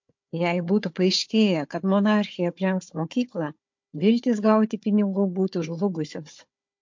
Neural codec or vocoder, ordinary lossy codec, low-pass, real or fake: codec, 16 kHz, 4 kbps, FunCodec, trained on Chinese and English, 50 frames a second; MP3, 48 kbps; 7.2 kHz; fake